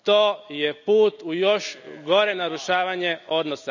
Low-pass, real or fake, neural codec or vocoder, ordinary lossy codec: 7.2 kHz; real; none; none